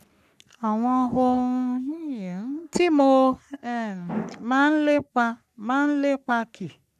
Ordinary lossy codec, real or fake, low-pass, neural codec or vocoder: none; fake; 14.4 kHz; codec, 44.1 kHz, 3.4 kbps, Pupu-Codec